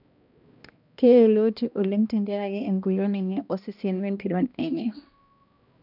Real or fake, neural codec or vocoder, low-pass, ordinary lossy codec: fake; codec, 16 kHz, 1 kbps, X-Codec, HuBERT features, trained on balanced general audio; 5.4 kHz; none